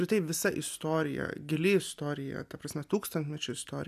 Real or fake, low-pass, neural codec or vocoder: real; 14.4 kHz; none